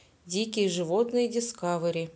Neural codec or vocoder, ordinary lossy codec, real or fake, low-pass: none; none; real; none